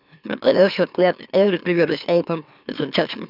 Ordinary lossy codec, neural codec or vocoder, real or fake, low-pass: none; autoencoder, 44.1 kHz, a latent of 192 numbers a frame, MeloTTS; fake; 5.4 kHz